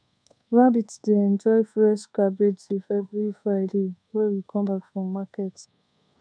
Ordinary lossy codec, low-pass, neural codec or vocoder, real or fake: none; 9.9 kHz; codec, 24 kHz, 1.2 kbps, DualCodec; fake